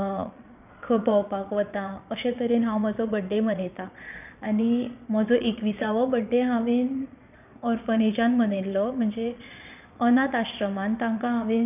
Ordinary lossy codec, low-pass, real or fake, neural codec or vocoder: none; 3.6 kHz; fake; vocoder, 22.05 kHz, 80 mel bands, Vocos